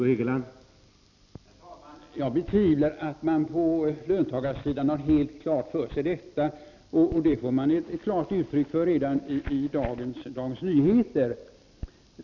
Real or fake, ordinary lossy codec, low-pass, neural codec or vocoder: real; none; 7.2 kHz; none